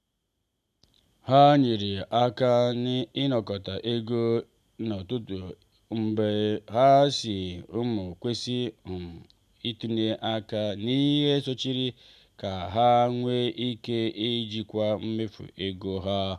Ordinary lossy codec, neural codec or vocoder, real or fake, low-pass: none; none; real; 14.4 kHz